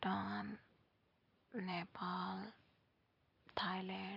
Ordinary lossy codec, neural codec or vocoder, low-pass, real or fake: none; vocoder, 44.1 kHz, 128 mel bands every 256 samples, BigVGAN v2; 5.4 kHz; fake